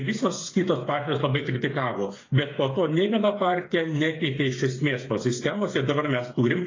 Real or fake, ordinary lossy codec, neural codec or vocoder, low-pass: fake; AAC, 32 kbps; codec, 16 kHz, 8 kbps, FreqCodec, smaller model; 7.2 kHz